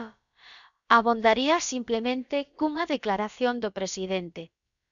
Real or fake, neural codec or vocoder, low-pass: fake; codec, 16 kHz, about 1 kbps, DyCAST, with the encoder's durations; 7.2 kHz